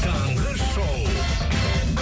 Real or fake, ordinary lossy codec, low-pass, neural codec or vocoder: real; none; none; none